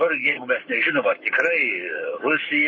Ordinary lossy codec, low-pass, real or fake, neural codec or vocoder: MP3, 32 kbps; 7.2 kHz; fake; codec, 44.1 kHz, 7.8 kbps, Pupu-Codec